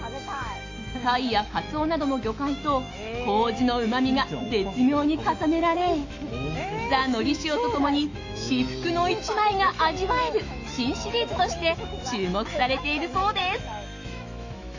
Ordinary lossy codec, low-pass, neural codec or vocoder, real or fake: AAC, 48 kbps; 7.2 kHz; autoencoder, 48 kHz, 128 numbers a frame, DAC-VAE, trained on Japanese speech; fake